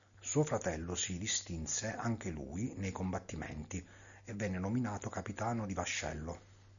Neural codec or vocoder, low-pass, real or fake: none; 7.2 kHz; real